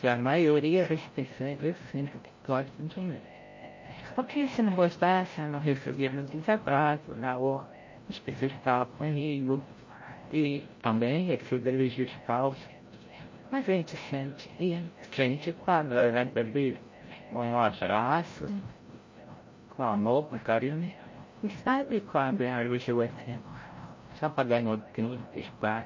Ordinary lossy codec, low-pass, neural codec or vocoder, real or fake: MP3, 32 kbps; 7.2 kHz; codec, 16 kHz, 0.5 kbps, FreqCodec, larger model; fake